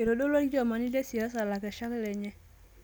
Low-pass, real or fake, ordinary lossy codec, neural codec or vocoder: none; real; none; none